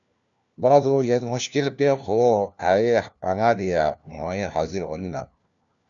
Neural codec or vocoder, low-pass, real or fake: codec, 16 kHz, 1 kbps, FunCodec, trained on LibriTTS, 50 frames a second; 7.2 kHz; fake